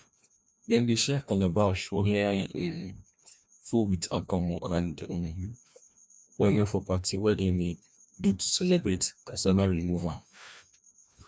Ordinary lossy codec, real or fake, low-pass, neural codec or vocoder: none; fake; none; codec, 16 kHz, 1 kbps, FreqCodec, larger model